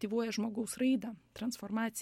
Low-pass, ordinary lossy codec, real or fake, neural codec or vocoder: 19.8 kHz; MP3, 64 kbps; real; none